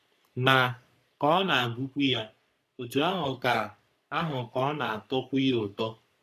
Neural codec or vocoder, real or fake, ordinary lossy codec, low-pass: codec, 44.1 kHz, 3.4 kbps, Pupu-Codec; fake; none; 14.4 kHz